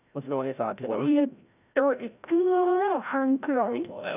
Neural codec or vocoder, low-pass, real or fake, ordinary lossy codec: codec, 16 kHz, 0.5 kbps, FreqCodec, larger model; 3.6 kHz; fake; none